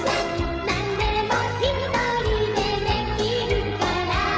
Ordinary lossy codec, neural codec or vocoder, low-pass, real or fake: none; codec, 16 kHz, 16 kbps, FreqCodec, smaller model; none; fake